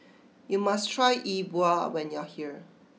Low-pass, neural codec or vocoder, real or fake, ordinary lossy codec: none; none; real; none